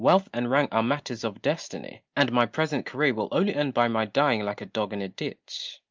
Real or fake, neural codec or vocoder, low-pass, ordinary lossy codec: real; none; 7.2 kHz; Opus, 24 kbps